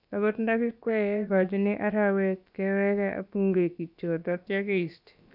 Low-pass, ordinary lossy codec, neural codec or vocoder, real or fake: 5.4 kHz; none; codec, 16 kHz, about 1 kbps, DyCAST, with the encoder's durations; fake